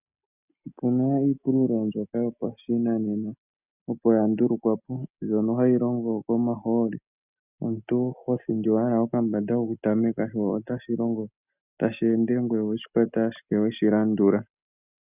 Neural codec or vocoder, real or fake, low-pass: none; real; 3.6 kHz